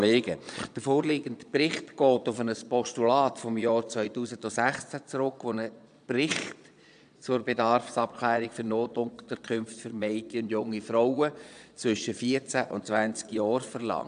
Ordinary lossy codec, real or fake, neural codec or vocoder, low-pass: AAC, 96 kbps; fake; vocoder, 22.05 kHz, 80 mel bands, Vocos; 9.9 kHz